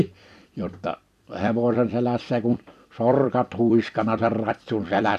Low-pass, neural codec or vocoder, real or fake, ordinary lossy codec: 14.4 kHz; vocoder, 44.1 kHz, 128 mel bands every 256 samples, BigVGAN v2; fake; none